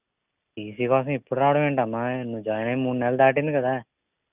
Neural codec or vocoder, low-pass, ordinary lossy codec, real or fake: none; 3.6 kHz; Opus, 64 kbps; real